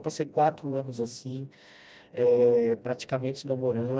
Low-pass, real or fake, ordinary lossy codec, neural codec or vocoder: none; fake; none; codec, 16 kHz, 1 kbps, FreqCodec, smaller model